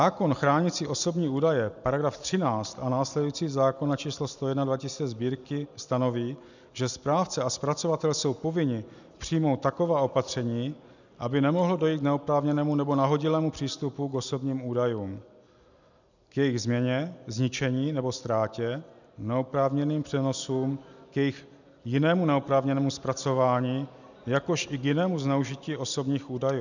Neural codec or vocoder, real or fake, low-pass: none; real; 7.2 kHz